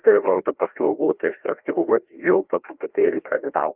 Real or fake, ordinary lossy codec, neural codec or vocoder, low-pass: fake; Opus, 32 kbps; codec, 16 kHz, 1 kbps, FreqCodec, larger model; 3.6 kHz